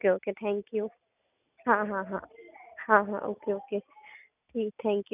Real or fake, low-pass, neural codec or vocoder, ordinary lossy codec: real; 3.6 kHz; none; none